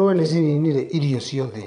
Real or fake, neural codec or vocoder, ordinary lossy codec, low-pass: fake; vocoder, 22.05 kHz, 80 mel bands, Vocos; none; 9.9 kHz